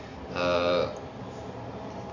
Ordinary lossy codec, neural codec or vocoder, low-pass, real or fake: AAC, 48 kbps; none; 7.2 kHz; real